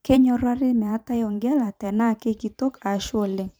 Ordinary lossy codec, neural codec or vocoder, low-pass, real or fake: none; vocoder, 44.1 kHz, 128 mel bands every 512 samples, BigVGAN v2; none; fake